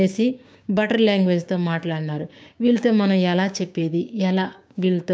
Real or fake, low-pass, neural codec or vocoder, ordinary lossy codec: fake; none; codec, 16 kHz, 6 kbps, DAC; none